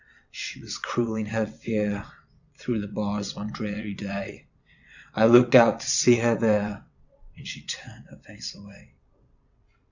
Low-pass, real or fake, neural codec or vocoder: 7.2 kHz; fake; vocoder, 22.05 kHz, 80 mel bands, WaveNeXt